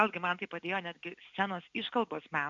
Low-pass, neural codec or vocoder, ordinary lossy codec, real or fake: 7.2 kHz; none; AAC, 64 kbps; real